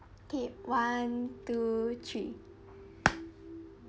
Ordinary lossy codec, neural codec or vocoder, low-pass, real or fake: none; none; none; real